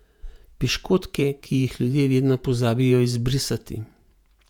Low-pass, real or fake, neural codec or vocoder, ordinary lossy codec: 19.8 kHz; fake; vocoder, 48 kHz, 128 mel bands, Vocos; none